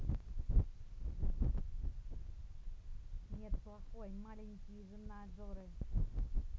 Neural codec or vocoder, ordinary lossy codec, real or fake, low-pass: none; none; real; none